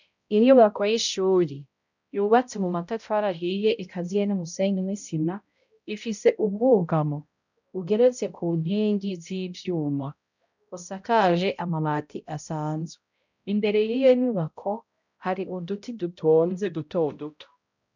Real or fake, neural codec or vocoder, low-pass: fake; codec, 16 kHz, 0.5 kbps, X-Codec, HuBERT features, trained on balanced general audio; 7.2 kHz